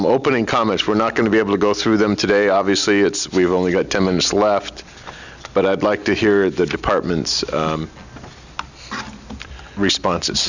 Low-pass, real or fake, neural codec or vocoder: 7.2 kHz; real; none